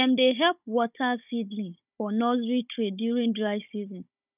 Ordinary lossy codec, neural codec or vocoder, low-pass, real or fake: none; codec, 16 kHz, 16 kbps, FunCodec, trained on Chinese and English, 50 frames a second; 3.6 kHz; fake